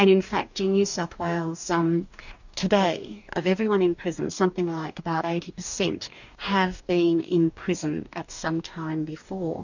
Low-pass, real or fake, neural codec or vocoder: 7.2 kHz; fake; codec, 44.1 kHz, 2.6 kbps, DAC